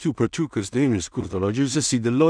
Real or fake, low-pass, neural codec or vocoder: fake; 9.9 kHz; codec, 16 kHz in and 24 kHz out, 0.4 kbps, LongCat-Audio-Codec, two codebook decoder